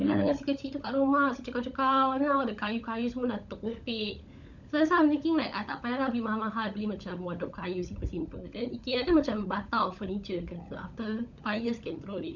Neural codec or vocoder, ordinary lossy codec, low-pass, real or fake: codec, 16 kHz, 16 kbps, FunCodec, trained on LibriTTS, 50 frames a second; none; 7.2 kHz; fake